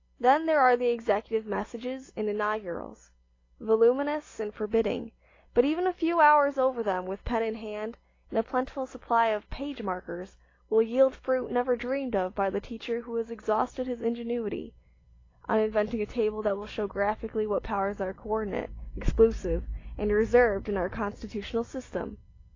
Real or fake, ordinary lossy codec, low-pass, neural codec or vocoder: real; AAC, 32 kbps; 7.2 kHz; none